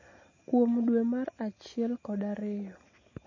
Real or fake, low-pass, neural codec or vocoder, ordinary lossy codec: real; 7.2 kHz; none; MP3, 32 kbps